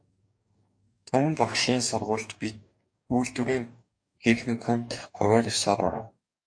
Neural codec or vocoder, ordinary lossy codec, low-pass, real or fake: codec, 44.1 kHz, 2.6 kbps, DAC; AAC, 64 kbps; 9.9 kHz; fake